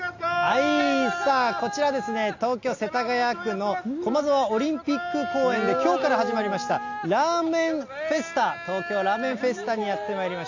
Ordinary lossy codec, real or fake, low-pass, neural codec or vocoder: AAC, 48 kbps; real; 7.2 kHz; none